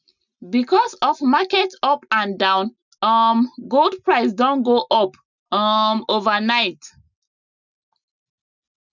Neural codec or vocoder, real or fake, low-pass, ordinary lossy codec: none; real; 7.2 kHz; none